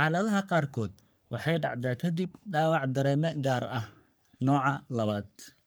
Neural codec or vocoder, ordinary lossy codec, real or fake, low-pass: codec, 44.1 kHz, 3.4 kbps, Pupu-Codec; none; fake; none